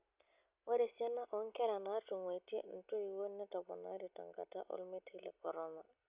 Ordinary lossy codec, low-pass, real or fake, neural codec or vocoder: Opus, 64 kbps; 3.6 kHz; real; none